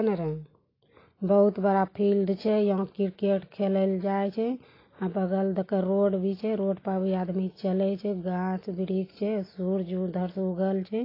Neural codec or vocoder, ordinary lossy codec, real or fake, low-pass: none; AAC, 24 kbps; real; 5.4 kHz